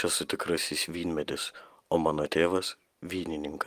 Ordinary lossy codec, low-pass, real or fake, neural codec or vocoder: Opus, 32 kbps; 14.4 kHz; real; none